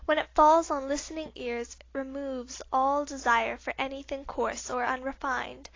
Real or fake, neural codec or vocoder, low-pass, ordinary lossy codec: real; none; 7.2 kHz; AAC, 32 kbps